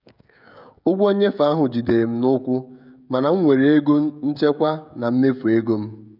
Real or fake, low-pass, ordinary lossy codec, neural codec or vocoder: fake; 5.4 kHz; none; codec, 16 kHz, 16 kbps, FreqCodec, smaller model